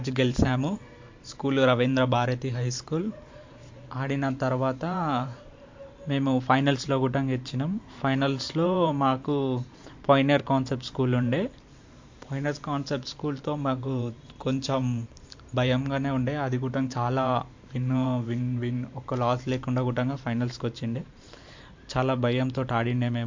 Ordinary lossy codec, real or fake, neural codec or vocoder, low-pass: MP3, 48 kbps; fake; vocoder, 44.1 kHz, 128 mel bands every 512 samples, BigVGAN v2; 7.2 kHz